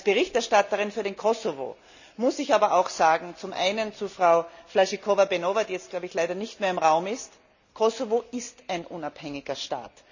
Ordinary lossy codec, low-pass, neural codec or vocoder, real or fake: none; 7.2 kHz; none; real